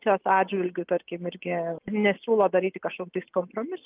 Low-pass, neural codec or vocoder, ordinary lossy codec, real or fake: 3.6 kHz; none; Opus, 24 kbps; real